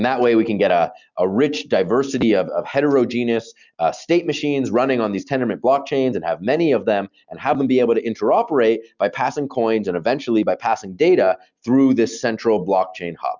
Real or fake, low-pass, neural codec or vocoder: real; 7.2 kHz; none